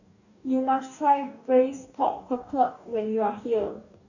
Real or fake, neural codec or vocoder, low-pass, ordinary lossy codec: fake; codec, 44.1 kHz, 2.6 kbps, DAC; 7.2 kHz; none